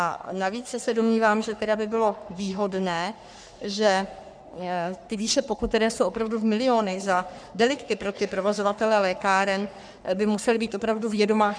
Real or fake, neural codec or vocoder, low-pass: fake; codec, 44.1 kHz, 3.4 kbps, Pupu-Codec; 9.9 kHz